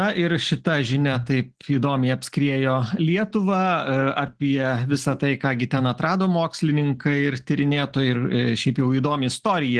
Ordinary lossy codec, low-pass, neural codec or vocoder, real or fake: Opus, 16 kbps; 10.8 kHz; none; real